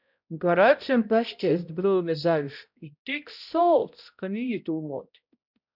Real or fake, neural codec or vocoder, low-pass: fake; codec, 16 kHz, 0.5 kbps, X-Codec, HuBERT features, trained on balanced general audio; 5.4 kHz